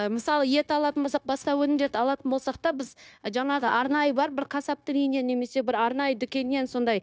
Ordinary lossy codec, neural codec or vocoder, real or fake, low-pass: none; codec, 16 kHz, 0.9 kbps, LongCat-Audio-Codec; fake; none